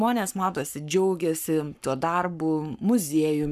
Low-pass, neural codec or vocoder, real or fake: 14.4 kHz; codec, 44.1 kHz, 7.8 kbps, Pupu-Codec; fake